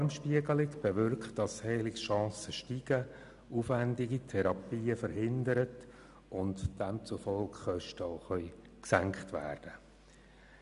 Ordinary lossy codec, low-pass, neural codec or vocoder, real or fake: none; 10.8 kHz; none; real